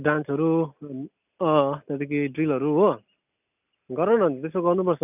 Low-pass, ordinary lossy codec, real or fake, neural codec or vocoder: 3.6 kHz; none; real; none